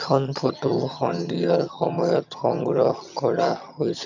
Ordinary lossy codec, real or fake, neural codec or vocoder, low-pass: none; fake; vocoder, 22.05 kHz, 80 mel bands, HiFi-GAN; 7.2 kHz